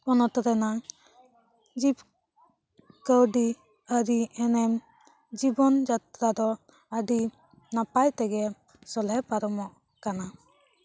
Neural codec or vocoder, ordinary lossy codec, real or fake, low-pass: none; none; real; none